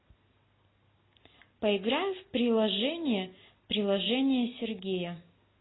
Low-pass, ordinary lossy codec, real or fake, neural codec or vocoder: 7.2 kHz; AAC, 16 kbps; real; none